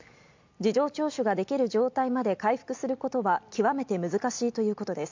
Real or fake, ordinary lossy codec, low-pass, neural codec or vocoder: real; MP3, 64 kbps; 7.2 kHz; none